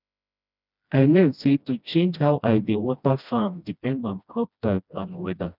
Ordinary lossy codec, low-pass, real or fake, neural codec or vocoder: none; 5.4 kHz; fake; codec, 16 kHz, 1 kbps, FreqCodec, smaller model